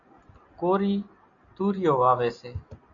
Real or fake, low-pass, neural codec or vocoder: real; 7.2 kHz; none